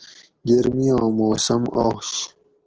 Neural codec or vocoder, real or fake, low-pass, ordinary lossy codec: none; real; 7.2 kHz; Opus, 16 kbps